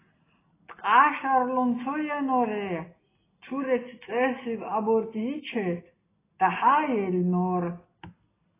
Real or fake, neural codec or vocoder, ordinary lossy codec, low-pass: real; none; AAC, 16 kbps; 3.6 kHz